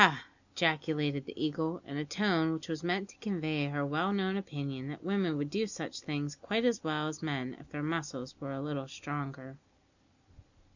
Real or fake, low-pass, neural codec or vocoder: real; 7.2 kHz; none